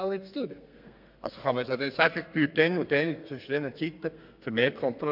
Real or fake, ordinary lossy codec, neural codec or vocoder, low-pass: fake; MP3, 48 kbps; codec, 32 kHz, 1.9 kbps, SNAC; 5.4 kHz